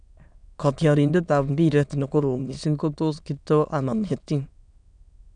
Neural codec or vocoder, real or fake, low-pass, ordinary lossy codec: autoencoder, 22.05 kHz, a latent of 192 numbers a frame, VITS, trained on many speakers; fake; 9.9 kHz; none